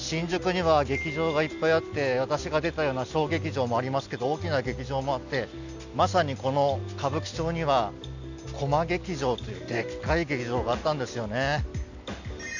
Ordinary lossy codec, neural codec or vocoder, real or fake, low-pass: none; none; real; 7.2 kHz